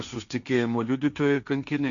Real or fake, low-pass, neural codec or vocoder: fake; 7.2 kHz; codec, 16 kHz, 1.1 kbps, Voila-Tokenizer